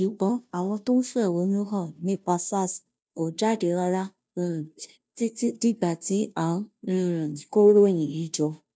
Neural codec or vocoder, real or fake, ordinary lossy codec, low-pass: codec, 16 kHz, 0.5 kbps, FunCodec, trained on Chinese and English, 25 frames a second; fake; none; none